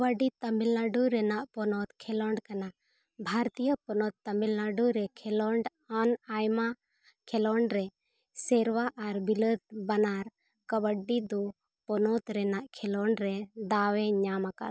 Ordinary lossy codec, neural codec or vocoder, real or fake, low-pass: none; none; real; none